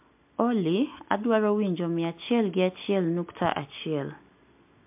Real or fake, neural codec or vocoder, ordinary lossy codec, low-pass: real; none; MP3, 32 kbps; 3.6 kHz